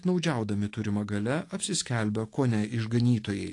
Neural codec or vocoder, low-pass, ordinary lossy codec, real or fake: autoencoder, 48 kHz, 128 numbers a frame, DAC-VAE, trained on Japanese speech; 10.8 kHz; AAC, 48 kbps; fake